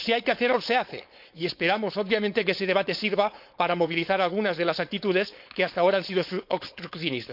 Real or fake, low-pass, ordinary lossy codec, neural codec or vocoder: fake; 5.4 kHz; none; codec, 16 kHz, 4.8 kbps, FACodec